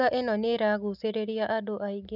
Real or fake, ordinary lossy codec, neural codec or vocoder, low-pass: real; none; none; 5.4 kHz